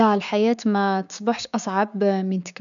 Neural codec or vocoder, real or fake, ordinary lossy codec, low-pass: codec, 16 kHz, 6 kbps, DAC; fake; none; 7.2 kHz